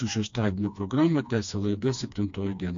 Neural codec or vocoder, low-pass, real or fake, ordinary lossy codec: codec, 16 kHz, 2 kbps, FreqCodec, smaller model; 7.2 kHz; fake; AAC, 64 kbps